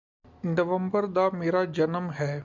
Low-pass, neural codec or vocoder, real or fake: 7.2 kHz; none; real